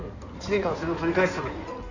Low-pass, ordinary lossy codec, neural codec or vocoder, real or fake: 7.2 kHz; none; codec, 16 kHz in and 24 kHz out, 2.2 kbps, FireRedTTS-2 codec; fake